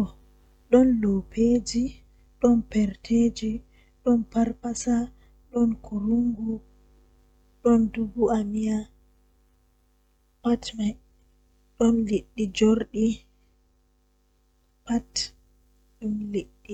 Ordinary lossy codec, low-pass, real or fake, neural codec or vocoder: none; 19.8 kHz; real; none